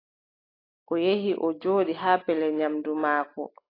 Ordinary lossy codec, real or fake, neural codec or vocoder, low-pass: AAC, 24 kbps; real; none; 5.4 kHz